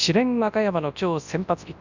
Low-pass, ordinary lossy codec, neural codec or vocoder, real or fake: 7.2 kHz; none; codec, 24 kHz, 0.9 kbps, WavTokenizer, large speech release; fake